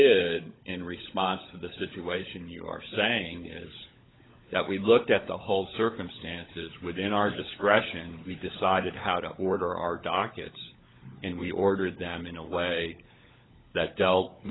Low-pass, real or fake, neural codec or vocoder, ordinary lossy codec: 7.2 kHz; fake; codec, 16 kHz, 16 kbps, FunCodec, trained on LibriTTS, 50 frames a second; AAC, 16 kbps